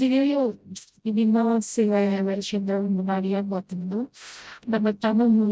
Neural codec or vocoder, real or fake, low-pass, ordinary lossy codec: codec, 16 kHz, 0.5 kbps, FreqCodec, smaller model; fake; none; none